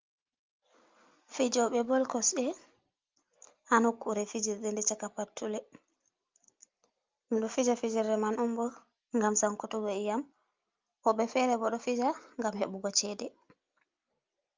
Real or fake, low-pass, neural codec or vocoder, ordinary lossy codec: real; 7.2 kHz; none; Opus, 32 kbps